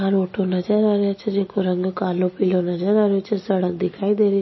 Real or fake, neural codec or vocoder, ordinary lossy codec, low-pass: real; none; MP3, 24 kbps; 7.2 kHz